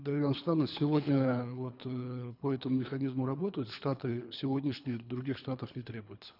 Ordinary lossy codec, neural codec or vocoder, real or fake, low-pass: none; codec, 24 kHz, 3 kbps, HILCodec; fake; 5.4 kHz